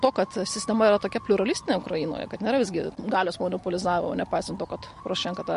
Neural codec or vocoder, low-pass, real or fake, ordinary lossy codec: none; 14.4 kHz; real; MP3, 48 kbps